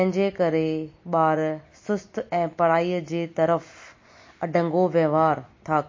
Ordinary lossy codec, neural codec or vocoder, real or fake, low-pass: MP3, 32 kbps; none; real; 7.2 kHz